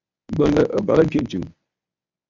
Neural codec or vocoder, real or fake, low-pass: codec, 24 kHz, 0.9 kbps, WavTokenizer, medium speech release version 1; fake; 7.2 kHz